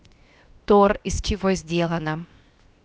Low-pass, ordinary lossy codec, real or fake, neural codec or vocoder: none; none; fake; codec, 16 kHz, 0.7 kbps, FocalCodec